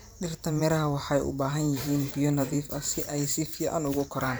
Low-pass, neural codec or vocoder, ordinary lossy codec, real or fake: none; vocoder, 44.1 kHz, 128 mel bands every 512 samples, BigVGAN v2; none; fake